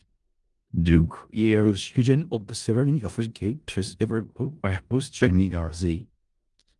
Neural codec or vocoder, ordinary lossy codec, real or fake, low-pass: codec, 16 kHz in and 24 kHz out, 0.4 kbps, LongCat-Audio-Codec, four codebook decoder; Opus, 32 kbps; fake; 10.8 kHz